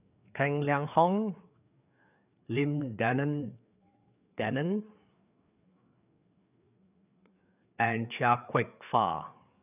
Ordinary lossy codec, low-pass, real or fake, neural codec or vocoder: none; 3.6 kHz; fake; codec, 16 kHz, 4 kbps, FreqCodec, larger model